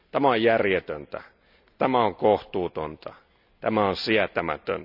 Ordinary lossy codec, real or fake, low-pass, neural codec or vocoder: none; real; 5.4 kHz; none